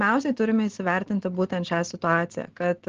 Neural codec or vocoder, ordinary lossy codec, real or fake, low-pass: none; Opus, 16 kbps; real; 7.2 kHz